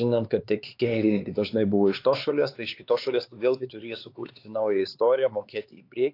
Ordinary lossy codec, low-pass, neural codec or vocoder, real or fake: AAC, 32 kbps; 5.4 kHz; codec, 16 kHz, 4 kbps, X-Codec, HuBERT features, trained on LibriSpeech; fake